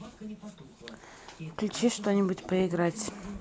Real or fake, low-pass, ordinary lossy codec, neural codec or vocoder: real; none; none; none